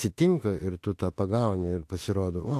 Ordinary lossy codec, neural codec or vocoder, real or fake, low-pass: AAC, 64 kbps; autoencoder, 48 kHz, 32 numbers a frame, DAC-VAE, trained on Japanese speech; fake; 14.4 kHz